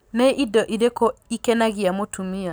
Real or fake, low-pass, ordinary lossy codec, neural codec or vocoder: real; none; none; none